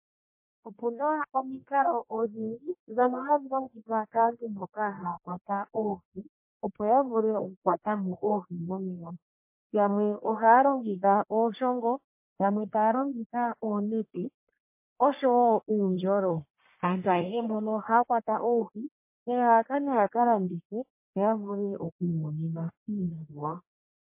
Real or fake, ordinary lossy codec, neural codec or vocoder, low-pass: fake; MP3, 24 kbps; codec, 44.1 kHz, 1.7 kbps, Pupu-Codec; 3.6 kHz